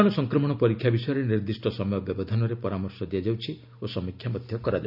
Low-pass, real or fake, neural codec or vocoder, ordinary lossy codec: 5.4 kHz; real; none; none